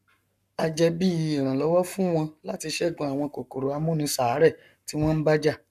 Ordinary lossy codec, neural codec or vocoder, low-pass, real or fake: none; codec, 44.1 kHz, 7.8 kbps, Pupu-Codec; 14.4 kHz; fake